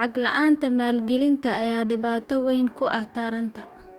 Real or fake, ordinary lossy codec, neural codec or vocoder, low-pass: fake; Opus, 64 kbps; codec, 44.1 kHz, 2.6 kbps, DAC; 19.8 kHz